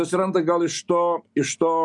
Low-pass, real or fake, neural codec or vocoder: 10.8 kHz; real; none